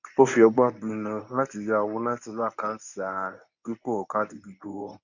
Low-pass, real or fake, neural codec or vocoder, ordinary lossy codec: 7.2 kHz; fake; codec, 24 kHz, 0.9 kbps, WavTokenizer, medium speech release version 1; none